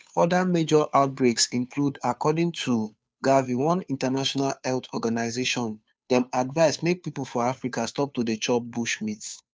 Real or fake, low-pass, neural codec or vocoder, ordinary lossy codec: fake; none; codec, 16 kHz, 2 kbps, FunCodec, trained on Chinese and English, 25 frames a second; none